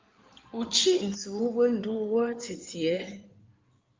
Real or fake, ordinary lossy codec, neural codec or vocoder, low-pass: fake; Opus, 32 kbps; codec, 16 kHz in and 24 kHz out, 2.2 kbps, FireRedTTS-2 codec; 7.2 kHz